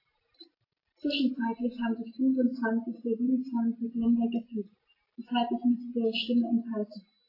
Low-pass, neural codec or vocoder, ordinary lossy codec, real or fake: 5.4 kHz; none; AAC, 24 kbps; real